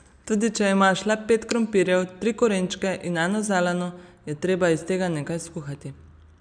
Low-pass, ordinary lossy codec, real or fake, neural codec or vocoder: 9.9 kHz; none; real; none